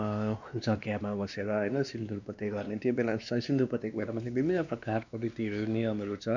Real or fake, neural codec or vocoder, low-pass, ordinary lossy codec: fake; codec, 16 kHz, 2 kbps, X-Codec, WavLM features, trained on Multilingual LibriSpeech; 7.2 kHz; none